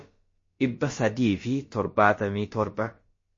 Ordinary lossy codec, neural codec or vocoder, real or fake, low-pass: MP3, 32 kbps; codec, 16 kHz, about 1 kbps, DyCAST, with the encoder's durations; fake; 7.2 kHz